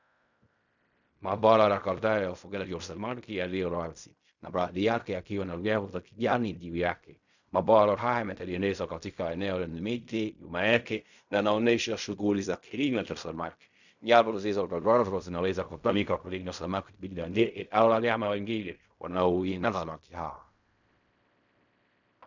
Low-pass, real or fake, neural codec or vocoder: 7.2 kHz; fake; codec, 16 kHz in and 24 kHz out, 0.4 kbps, LongCat-Audio-Codec, fine tuned four codebook decoder